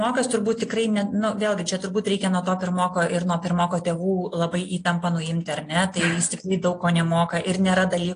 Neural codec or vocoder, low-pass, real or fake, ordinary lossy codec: none; 9.9 kHz; real; AAC, 48 kbps